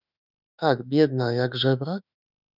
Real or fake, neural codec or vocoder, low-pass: fake; autoencoder, 48 kHz, 32 numbers a frame, DAC-VAE, trained on Japanese speech; 5.4 kHz